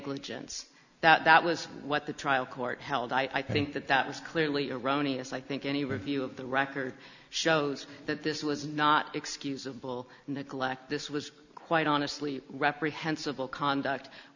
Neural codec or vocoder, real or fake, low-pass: none; real; 7.2 kHz